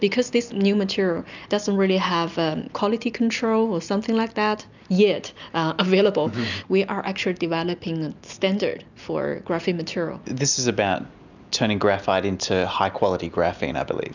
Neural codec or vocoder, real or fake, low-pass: none; real; 7.2 kHz